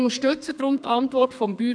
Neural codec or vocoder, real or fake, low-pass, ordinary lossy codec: codec, 32 kHz, 1.9 kbps, SNAC; fake; 9.9 kHz; none